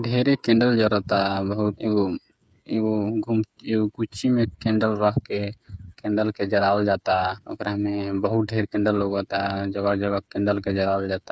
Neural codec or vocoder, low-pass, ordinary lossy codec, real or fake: codec, 16 kHz, 8 kbps, FreqCodec, smaller model; none; none; fake